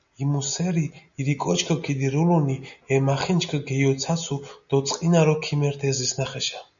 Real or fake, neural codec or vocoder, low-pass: real; none; 7.2 kHz